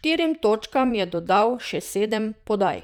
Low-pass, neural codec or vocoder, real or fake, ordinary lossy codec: 19.8 kHz; vocoder, 44.1 kHz, 128 mel bands, Pupu-Vocoder; fake; none